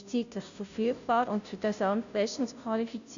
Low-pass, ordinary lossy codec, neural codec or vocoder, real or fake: 7.2 kHz; none; codec, 16 kHz, 0.5 kbps, FunCodec, trained on Chinese and English, 25 frames a second; fake